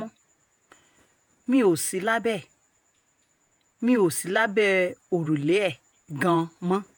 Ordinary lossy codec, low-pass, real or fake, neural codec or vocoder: none; none; fake; vocoder, 48 kHz, 128 mel bands, Vocos